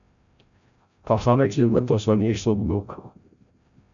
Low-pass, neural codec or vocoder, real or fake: 7.2 kHz; codec, 16 kHz, 0.5 kbps, FreqCodec, larger model; fake